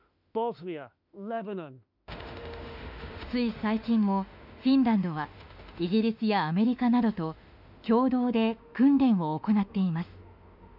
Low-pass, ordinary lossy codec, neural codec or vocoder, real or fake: 5.4 kHz; none; autoencoder, 48 kHz, 32 numbers a frame, DAC-VAE, trained on Japanese speech; fake